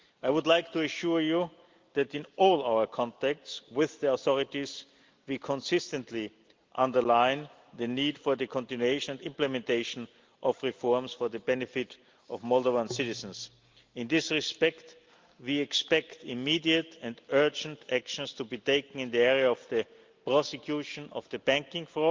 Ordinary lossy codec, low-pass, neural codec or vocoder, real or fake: Opus, 32 kbps; 7.2 kHz; none; real